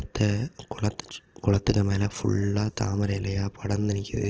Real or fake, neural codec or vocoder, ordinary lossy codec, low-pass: real; none; Opus, 16 kbps; 7.2 kHz